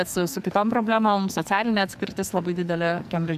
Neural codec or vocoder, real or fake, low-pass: codec, 44.1 kHz, 2.6 kbps, SNAC; fake; 14.4 kHz